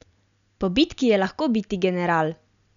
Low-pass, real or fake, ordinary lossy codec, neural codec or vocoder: 7.2 kHz; real; none; none